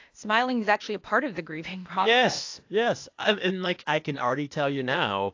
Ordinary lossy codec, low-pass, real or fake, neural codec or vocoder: AAC, 48 kbps; 7.2 kHz; fake; codec, 16 kHz, 0.8 kbps, ZipCodec